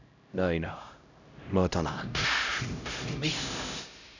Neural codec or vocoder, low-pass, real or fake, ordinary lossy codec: codec, 16 kHz, 0.5 kbps, X-Codec, HuBERT features, trained on LibriSpeech; 7.2 kHz; fake; none